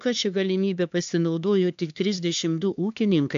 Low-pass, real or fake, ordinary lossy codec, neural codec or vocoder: 7.2 kHz; fake; AAC, 64 kbps; codec, 16 kHz, 2 kbps, X-Codec, HuBERT features, trained on LibriSpeech